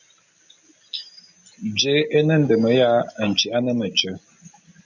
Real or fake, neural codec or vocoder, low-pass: real; none; 7.2 kHz